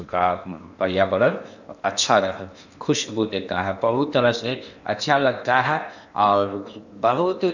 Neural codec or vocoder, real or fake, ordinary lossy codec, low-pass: codec, 16 kHz in and 24 kHz out, 0.8 kbps, FocalCodec, streaming, 65536 codes; fake; none; 7.2 kHz